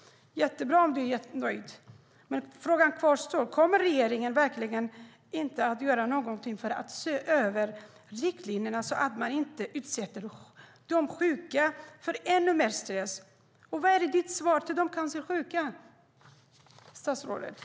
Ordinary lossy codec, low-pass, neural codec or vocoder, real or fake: none; none; none; real